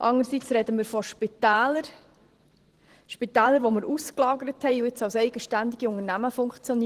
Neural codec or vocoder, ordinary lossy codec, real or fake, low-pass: vocoder, 44.1 kHz, 128 mel bands every 512 samples, BigVGAN v2; Opus, 16 kbps; fake; 14.4 kHz